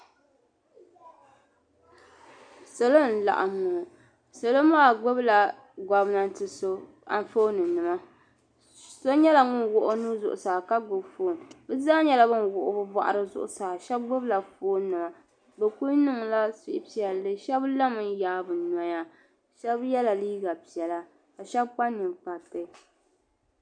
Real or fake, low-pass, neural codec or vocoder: real; 9.9 kHz; none